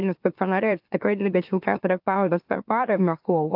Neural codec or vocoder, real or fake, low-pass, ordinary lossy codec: autoencoder, 44.1 kHz, a latent of 192 numbers a frame, MeloTTS; fake; 5.4 kHz; AAC, 48 kbps